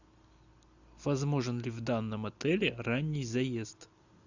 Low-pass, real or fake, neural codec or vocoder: 7.2 kHz; real; none